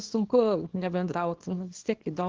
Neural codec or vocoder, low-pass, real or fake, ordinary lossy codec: codec, 24 kHz, 0.9 kbps, WavTokenizer, small release; 7.2 kHz; fake; Opus, 16 kbps